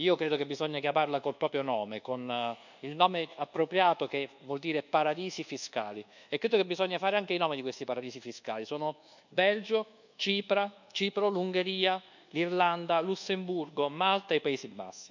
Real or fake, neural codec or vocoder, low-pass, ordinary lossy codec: fake; codec, 24 kHz, 1.2 kbps, DualCodec; 7.2 kHz; none